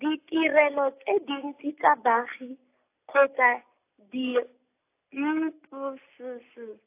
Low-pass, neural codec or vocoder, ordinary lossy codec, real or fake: 3.6 kHz; none; AAC, 24 kbps; real